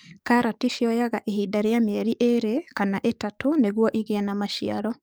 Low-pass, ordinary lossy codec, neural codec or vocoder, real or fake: none; none; codec, 44.1 kHz, 7.8 kbps, DAC; fake